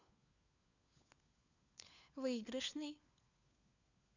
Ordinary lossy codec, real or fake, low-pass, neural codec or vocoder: none; fake; 7.2 kHz; codec, 16 kHz, 4 kbps, FunCodec, trained on LibriTTS, 50 frames a second